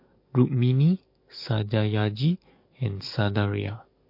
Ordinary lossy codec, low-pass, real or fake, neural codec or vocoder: MP3, 32 kbps; 5.4 kHz; fake; codec, 44.1 kHz, 7.8 kbps, DAC